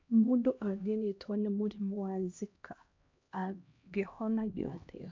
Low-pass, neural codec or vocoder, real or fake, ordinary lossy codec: 7.2 kHz; codec, 16 kHz, 1 kbps, X-Codec, HuBERT features, trained on LibriSpeech; fake; MP3, 48 kbps